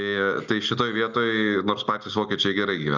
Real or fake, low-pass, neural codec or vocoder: real; 7.2 kHz; none